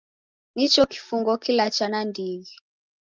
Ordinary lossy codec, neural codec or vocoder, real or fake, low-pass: Opus, 32 kbps; none; real; 7.2 kHz